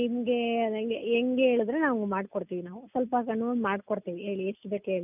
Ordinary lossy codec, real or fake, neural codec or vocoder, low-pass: none; real; none; 3.6 kHz